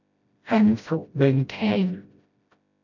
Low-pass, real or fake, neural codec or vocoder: 7.2 kHz; fake; codec, 16 kHz, 0.5 kbps, FreqCodec, smaller model